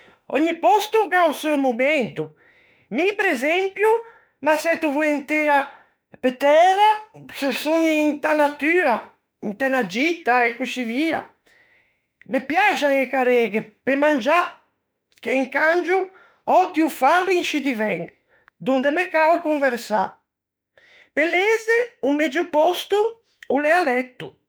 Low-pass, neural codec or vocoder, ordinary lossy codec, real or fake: none; autoencoder, 48 kHz, 32 numbers a frame, DAC-VAE, trained on Japanese speech; none; fake